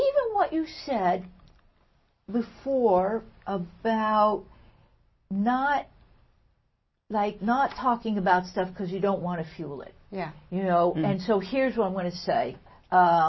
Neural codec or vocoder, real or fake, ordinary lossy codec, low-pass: none; real; MP3, 24 kbps; 7.2 kHz